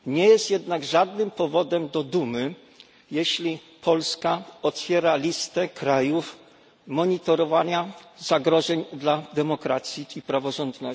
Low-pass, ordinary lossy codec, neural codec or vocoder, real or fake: none; none; none; real